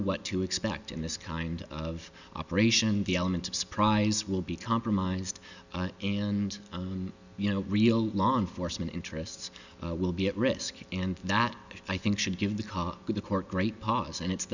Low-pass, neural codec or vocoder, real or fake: 7.2 kHz; none; real